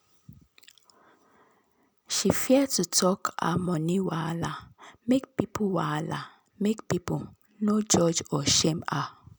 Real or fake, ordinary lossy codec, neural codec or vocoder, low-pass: real; none; none; none